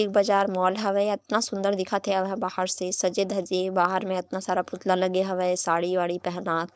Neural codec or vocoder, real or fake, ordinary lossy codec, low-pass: codec, 16 kHz, 4.8 kbps, FACodec; fake; none; none